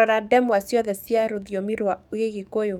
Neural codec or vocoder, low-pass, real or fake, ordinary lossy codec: codec, 44.1 kHz, 7.8 kbps, DAC; 19.8 kHz; fake; none